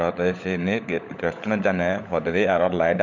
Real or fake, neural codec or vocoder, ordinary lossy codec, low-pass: fake; codec, 16 kHz, 16 kbps, FunCodec, trained on LibriTTS, 50 frames a second; none; 7.2 kHz